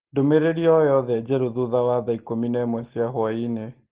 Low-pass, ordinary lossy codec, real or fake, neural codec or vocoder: 3.6 kHz; Opus, 16 kbps; real; none